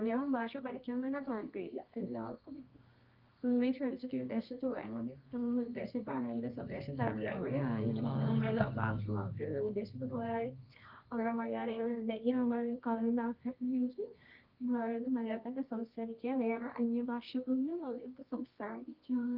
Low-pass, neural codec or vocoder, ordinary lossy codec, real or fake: 5.4 kHz; codec, 24 kHz, 0.9 kbps, WavTokenizer, medium music audio release; Opus, 24 kbps; fake